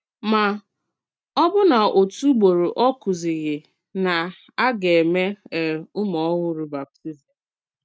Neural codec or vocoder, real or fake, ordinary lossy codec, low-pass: none; real; none; none